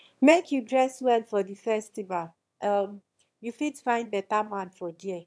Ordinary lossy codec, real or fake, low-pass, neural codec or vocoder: none; fake; none; autoencoder, 22.05 kHz, a latent of 192 numbers a frame, VITS, trained on one speaker